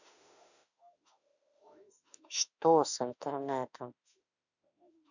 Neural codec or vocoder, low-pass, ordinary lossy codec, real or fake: autoencoder, 48 kHz, 32 numbers a frame, DAC-VAE, trained on Japanese speech; 7.2 kHz; none; fake